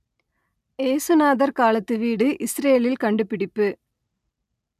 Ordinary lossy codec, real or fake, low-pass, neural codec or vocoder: MP3, 96 kbps; real; 14.4 kHz; none